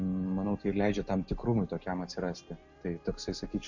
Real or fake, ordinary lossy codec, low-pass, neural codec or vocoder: real; MP3, 48 kbps; 7.2 kHz; none